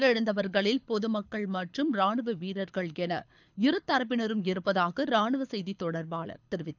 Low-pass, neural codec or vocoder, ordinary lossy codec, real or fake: 7.2 kHz; codec, 24 kHz, 6 kbps, HILCodec; none; fake